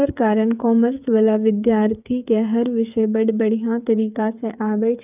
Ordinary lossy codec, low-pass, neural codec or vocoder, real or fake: none; 3.6 kHz; codec, 16 kHz, 8 kbps, FreqCodec, smaller model; fake